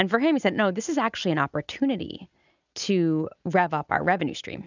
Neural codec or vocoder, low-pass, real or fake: none; 7.2 kHz; real